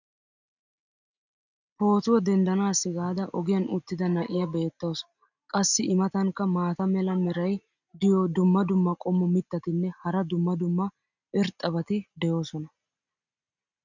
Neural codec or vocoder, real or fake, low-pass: none; real; 7.2 kHz